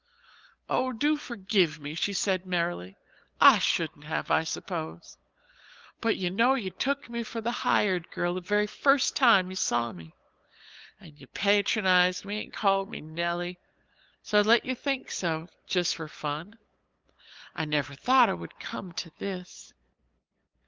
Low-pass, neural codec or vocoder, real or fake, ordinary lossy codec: 7.2 kHz; codec, 16 kHz, 8 kbps, FunCodec, trained on LibriTTS, 25 frames a second; fake; Opus, 32 kbps